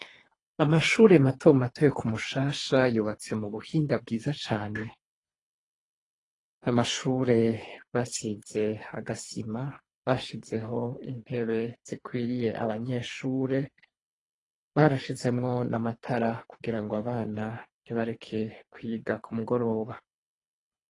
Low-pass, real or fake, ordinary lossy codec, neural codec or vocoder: 10.8 kHz; fake; AAC, 32 kbps; codec, 24 kHz, 3 kbps, HILCodec